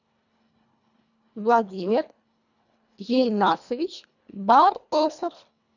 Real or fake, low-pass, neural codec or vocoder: fake; 7.2 kHz; codec, 24 kHz, 1.5 kbps, HILCodec